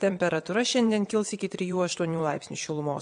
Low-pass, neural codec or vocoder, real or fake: 9.9 kHz; vocoder, 22.05 kHz, 80 mel bands, WaveNeXt; fake